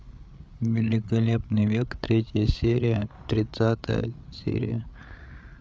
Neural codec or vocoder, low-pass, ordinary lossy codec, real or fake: codec, 16 kHz, 8 kbps, FreqCodec, larger model; none; none; fake